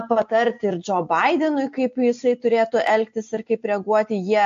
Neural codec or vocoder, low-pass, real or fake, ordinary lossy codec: none; 7.2 kHz; real; AAC, 48 kbps